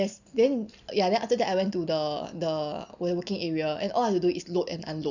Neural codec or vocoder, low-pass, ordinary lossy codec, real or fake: none; 7.2 kHz; none; real